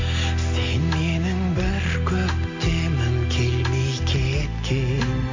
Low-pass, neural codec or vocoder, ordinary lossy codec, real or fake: 7.2 kHz; none; MP3, 48 kbps; real